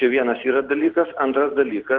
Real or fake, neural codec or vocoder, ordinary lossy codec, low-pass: real; none; Opus, 16 kbps; 7.2 kHz